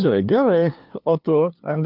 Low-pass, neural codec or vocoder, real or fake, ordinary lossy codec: 5.4 kHz; codec, 16 kHz, 2 kbps, FunCodec, trained on LibriTTS, 25 frames a second; fake; Opus, 32 kbps